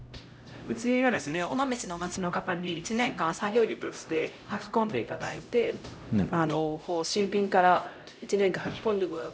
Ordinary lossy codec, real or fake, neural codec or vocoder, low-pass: none; fake; codec, 16 kHz, 0.5 kbps, X-Codec, HuBERT features, trained on LibriSpeech; none